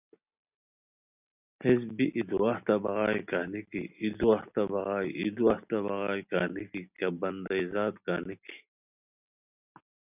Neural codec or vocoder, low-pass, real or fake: none; 3.6 kHz; real